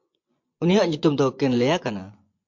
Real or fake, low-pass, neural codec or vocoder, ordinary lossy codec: fake; 7.2 kHz; vocoder, 24 kHz, 100 mel bands, Vocos; MP3, 48 kbps